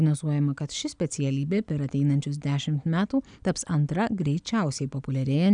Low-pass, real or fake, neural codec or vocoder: 9.9 kHz; real; none